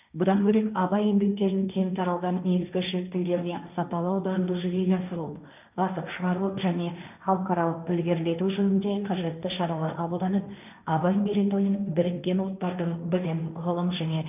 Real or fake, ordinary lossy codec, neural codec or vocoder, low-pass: fake; none; codec, 16 kHz, 1.1 kbps, Voila-Tokenizer; 3.6 kHz